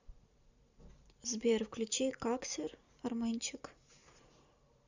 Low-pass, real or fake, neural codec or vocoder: 7.2 kHz; fake; vocoder, 44.1 kHz, 128 mel bands every 512 samples, BigVGAN v2